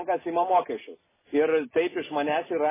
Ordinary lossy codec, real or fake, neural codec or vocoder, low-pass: MP3, 16 kbps; real; none; 3.6 kHz